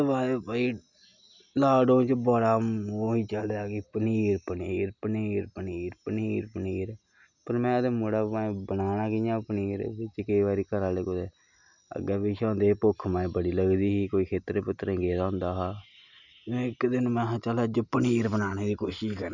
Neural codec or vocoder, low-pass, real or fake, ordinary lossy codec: none; 7.2 kHz; real; none